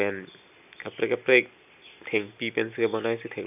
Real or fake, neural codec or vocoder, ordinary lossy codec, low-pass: real; none; none; 3.6 kHz